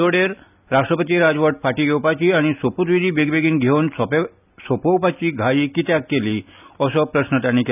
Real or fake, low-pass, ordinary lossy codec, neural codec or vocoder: real; 3.6 kHz; none; none